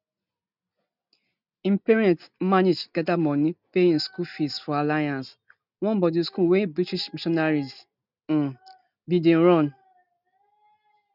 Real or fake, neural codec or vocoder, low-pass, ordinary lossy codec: real; none; 5.4 kHz; none